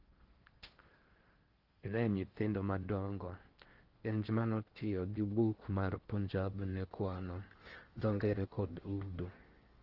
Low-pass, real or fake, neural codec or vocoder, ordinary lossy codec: 5.4 kHz; fake; codec, 16 kHz in and 24 kHz out, 0.6 kbps, FocalCodec, streaming, 4096 codes; Opus, 16 kbps